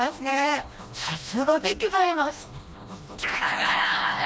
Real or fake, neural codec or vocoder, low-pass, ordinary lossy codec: fake; codec, 16 kHz, 1 kbps, FreqCodec, smaller model; none; none